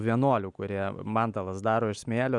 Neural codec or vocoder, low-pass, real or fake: none; 10.8 kHz; real